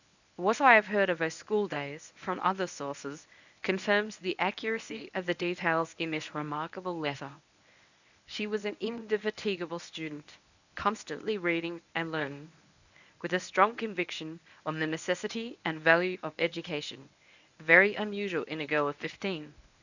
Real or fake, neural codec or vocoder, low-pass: fake; codec, 24 kHz, 0.9 kbps, WavTokenizer, medium speech release version 1; 7.2 kHz